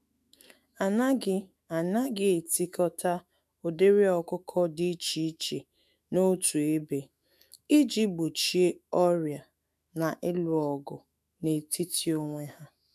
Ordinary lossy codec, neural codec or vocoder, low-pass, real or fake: none; autoencoder, 48 kHz, 128 numbers a frame, DAC-VAE, trained on Japanese speech; 14.4 kHz; fake